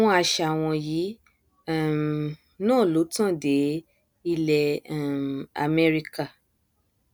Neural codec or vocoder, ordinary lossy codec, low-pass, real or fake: none; none; none; real